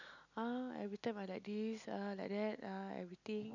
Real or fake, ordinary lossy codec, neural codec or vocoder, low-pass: real; none; none; 7.2 kHz